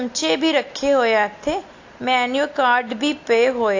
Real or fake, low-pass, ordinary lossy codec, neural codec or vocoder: real; 7.2 kHz; AAC, 48 kbps; none